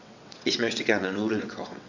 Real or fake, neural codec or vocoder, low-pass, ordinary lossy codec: fake; vocoder, 22.05 kHz, 80 mel bands, WaveNeXt; 7.2 kHz; none